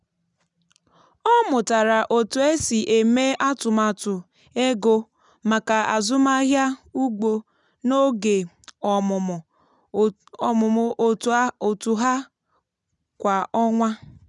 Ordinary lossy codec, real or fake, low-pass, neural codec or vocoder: none; real; 10.8 kHz; none